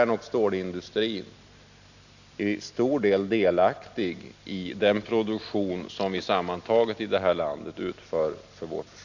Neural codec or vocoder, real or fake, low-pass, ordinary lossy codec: none; real; 7.2 kHz; none